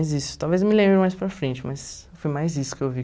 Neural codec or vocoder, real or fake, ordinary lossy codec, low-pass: none; real; none; none